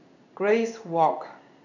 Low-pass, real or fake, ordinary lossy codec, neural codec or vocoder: 7.2 kHz; fake; none; codec, 16 kHz in and 24 kHz out, 1 kbps, XY-Tokenizer